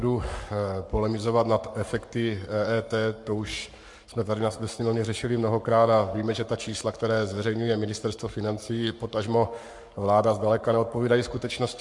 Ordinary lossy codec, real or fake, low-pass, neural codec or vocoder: MP3, 64 kbps; fake; 10.8 kHz; codec, 44.1 kHz, 7.8 kbps, Pupu-Codec